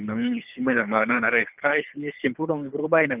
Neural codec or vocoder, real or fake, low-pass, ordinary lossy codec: codec, 24 kHz, 3 kbps, HILCodec; fake; 3.6 kHz; Opus, 32 kbps